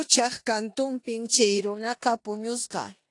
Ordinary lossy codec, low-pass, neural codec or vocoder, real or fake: AAC, 48 kbps; 10.8 kHz; codec, 16 kHz in and 24 kHz out, 0.9 kbps, LongCat-Audio-Codec, four codebook decoder; fake